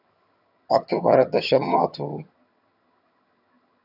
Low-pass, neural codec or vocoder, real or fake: 5.4 kHz; vocoder, 22.05 kHz, 80 mel bands, HiFi-GAN; fake